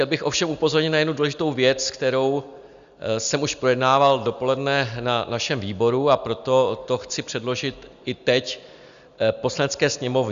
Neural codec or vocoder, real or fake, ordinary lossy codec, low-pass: none; real; Opus, 64 kbps; 7.2 kHz